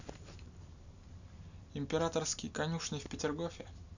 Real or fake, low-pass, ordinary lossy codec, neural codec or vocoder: real; 7.2 kHz; none; none